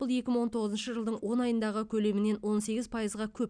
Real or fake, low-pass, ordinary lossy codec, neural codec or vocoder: real; 9.9 kHz; none; none